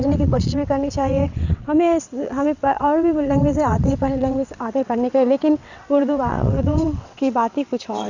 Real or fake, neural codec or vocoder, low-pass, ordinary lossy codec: fake; vocoder, 22.05 kHz, 80 mel bands, WaveNeXt; 7.2 kHz; none